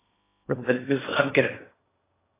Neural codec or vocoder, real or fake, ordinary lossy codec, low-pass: codec, 16 kHz in and 24 kHz out, 0.8 kbps, FocalCodec, streaming, 65536 codes; fake; AAC, 16 kbps; 3.6 kHz